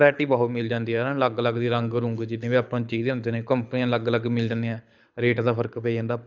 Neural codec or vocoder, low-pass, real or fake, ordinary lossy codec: codec, 24 kHz, 6 kbps, HILCodec; 7.2 kHz; fake; none